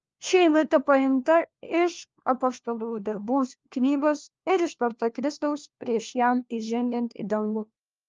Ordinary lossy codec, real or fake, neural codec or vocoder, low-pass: Opus, 24 kbps; fake; codec, 16 kHz, 1 kbps, FunCodec, trained on LibriTTS, 50 frames a second; 7.2 kHz